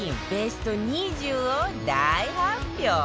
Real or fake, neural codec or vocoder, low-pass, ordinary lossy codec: real; none; none; none